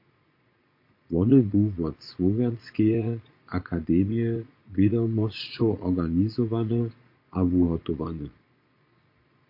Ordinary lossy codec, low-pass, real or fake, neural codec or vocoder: MP3, 32 kbps; 5.4 kHz; fake; vocoder, 22.05 kHz, 80 mel bands, Vocos